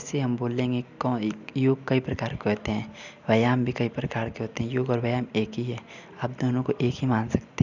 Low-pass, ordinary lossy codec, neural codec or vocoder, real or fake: 7.2 kHz; none; none; real